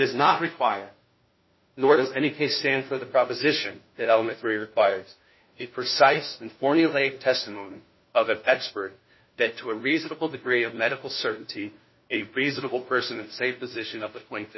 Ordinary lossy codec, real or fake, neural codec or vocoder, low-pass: MP3, 24 kbps; fake; codec, 16 kHz, 1 kbps, FunCodec, trained on LibriTTS, 50 frames a second; 7.2 kHz